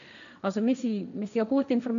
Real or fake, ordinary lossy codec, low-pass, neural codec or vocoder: fake; none; 7.2 kHz; codec, 16 kHz, 1.1 kbps, Voila-Tokenizer